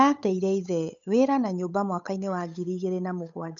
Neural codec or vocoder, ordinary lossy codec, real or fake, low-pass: codec, 16 kHz, 8 kbps, FunCodec, trained on Chinese and English, 25 frames a second; none; fake; 7.2 kHz